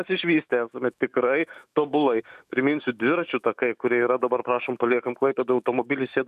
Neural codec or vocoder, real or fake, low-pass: autoencoder, 48 kHz, 128 numbers a frame, DAC-VAE, trained on Japanese speech; fake; 14.4 kHz